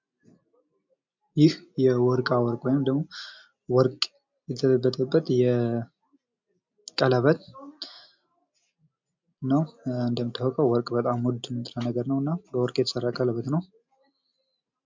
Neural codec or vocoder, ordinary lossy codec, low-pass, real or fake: none; MP3, 64 kbps; 7.2 kHz; real